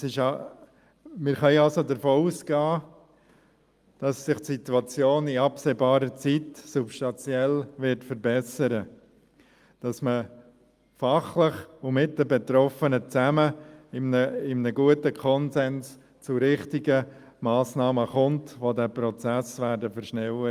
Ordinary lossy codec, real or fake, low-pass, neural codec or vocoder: Opus, 32 kbps; real; 14.4 kHz; none